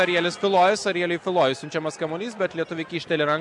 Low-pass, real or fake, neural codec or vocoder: 10.8 kHz; real; none